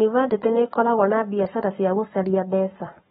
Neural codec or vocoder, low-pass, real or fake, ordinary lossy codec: codec, 44.1 kHz, 7.8 kbps, Pupu-Codec; 19.8 kHz; fake; AAC, 16 kbps